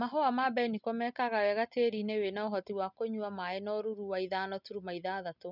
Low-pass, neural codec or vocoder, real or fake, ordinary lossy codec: 5.4 kHz; none; real; none